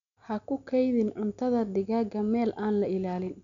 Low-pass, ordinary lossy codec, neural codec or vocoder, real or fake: 7.2 kHz; none; none; real